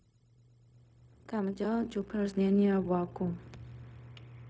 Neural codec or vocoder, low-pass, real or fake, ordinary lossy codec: codec, 16 kHz, 0.4 kbps, LongCat-Audio-Codec; none; fake; none